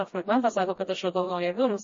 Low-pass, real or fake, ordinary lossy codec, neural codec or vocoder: 7.2 kHz; fake; MP3, 32 kbps; codec, 16 kHz, 1 kbps, FreqCodec, smaller model